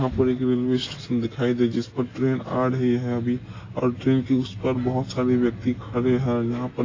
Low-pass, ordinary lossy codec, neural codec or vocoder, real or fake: 7.2 kHz; AAC, 32 kbps; none; real